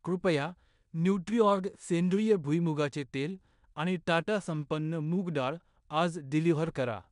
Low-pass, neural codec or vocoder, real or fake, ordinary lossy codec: 10.8 kHz; codec, 16 kHz in and 24 kHz out, 0.9 kbps, LongCat-Audio-Codec, four codebook decoder; fake; MP3, 96 kbps